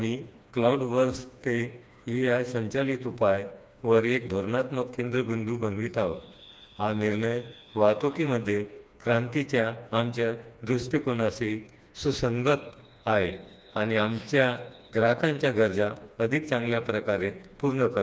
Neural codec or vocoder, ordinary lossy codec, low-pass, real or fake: codec, 16 kHz, 2 kbps, FreqCodec, smaller model; none; none; fake